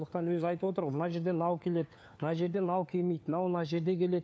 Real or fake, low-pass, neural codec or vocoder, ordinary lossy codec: fake; none; codec, 16 kHz, 4 kbps, FunCodec, trained on LibriTTS, 50 frames a second; none